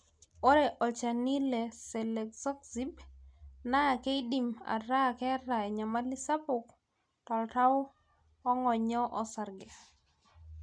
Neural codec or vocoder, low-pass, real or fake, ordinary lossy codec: none; 9.9 kHz; real; MP3, 96 kbps